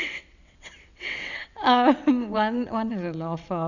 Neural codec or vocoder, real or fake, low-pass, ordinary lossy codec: vocoder, 22.05 kHz, 80 mel bands, WaveNeXt; fake; 7.2 kHz; none